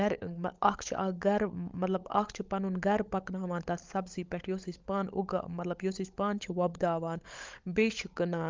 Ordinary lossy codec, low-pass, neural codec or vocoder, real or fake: Opus, 24 kbps; 7.2 kHz; codec, 16 kHz, 16 kbps, FunCodec, trained on LibriTTS, 50 frames a second; fake